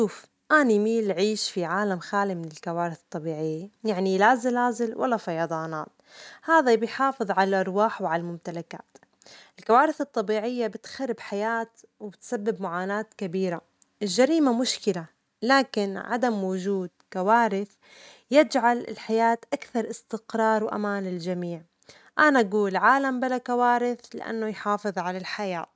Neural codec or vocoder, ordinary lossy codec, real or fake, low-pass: none; none; real; none